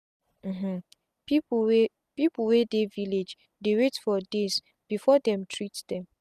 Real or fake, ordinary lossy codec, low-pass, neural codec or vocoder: real; none; 14.4 kHz; none